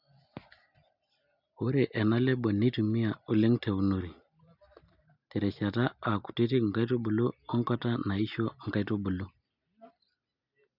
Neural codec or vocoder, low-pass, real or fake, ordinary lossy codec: none; 5.4 kHz; real; none